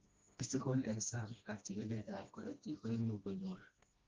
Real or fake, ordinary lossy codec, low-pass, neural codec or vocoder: fake; Opus, 32 kbps; 7.2 kHz; codec, 16 kHz, 1 kbps, FreqCodec, smaller model